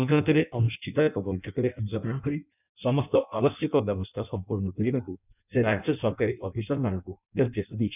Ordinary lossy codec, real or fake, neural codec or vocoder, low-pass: none; fake; codec, 16 kHz in and 24 kHz out, 0.6 kbps, FireRedTTS-2 codec; 3.6 kHz